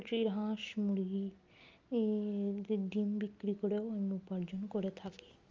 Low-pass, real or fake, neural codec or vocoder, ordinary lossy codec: 7.2 kHz; real; none; Opus, 32 kbps